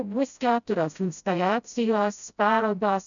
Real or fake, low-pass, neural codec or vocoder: fake; 7.2 kHz; codec, 16 kHz, 0.5 kbps, FreqCodec, smaller model